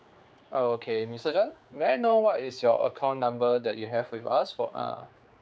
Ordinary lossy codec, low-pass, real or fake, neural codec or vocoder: none; none; fake; codec, 16 kHz, 4 kbps, X-Codec, HuBERT features, trained on general audio